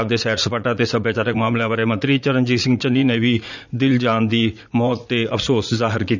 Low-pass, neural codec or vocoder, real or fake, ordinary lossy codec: 7.2 kHz; vocoder, 44.1 kHz, 80 mel bands, Vocos; fake; none